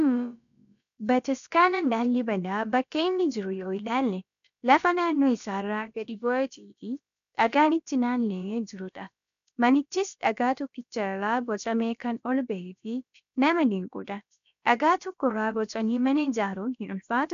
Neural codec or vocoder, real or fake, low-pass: codec, 16 kHz, about 1 kbps, DyCAST, with the encoder's durations; fake; 7.2 kHz